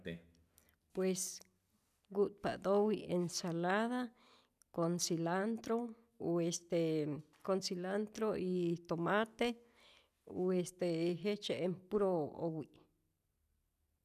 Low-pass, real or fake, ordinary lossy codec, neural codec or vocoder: 14.4 kHz; real; none; none